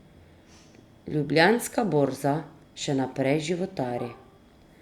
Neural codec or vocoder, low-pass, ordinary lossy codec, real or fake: none; 19.8 kHz; Opus, 64 kbps; real